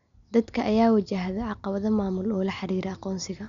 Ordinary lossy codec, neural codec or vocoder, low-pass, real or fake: none; none; 7.2 kHz; real